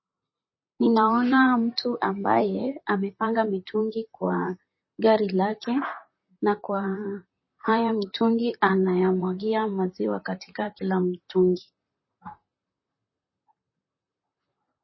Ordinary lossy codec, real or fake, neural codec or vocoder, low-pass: MP3, 24 kbps; fake; vocoder, 44.1 kHz, 128 mel bands, Pupu-Vocoder; 7.2 kHz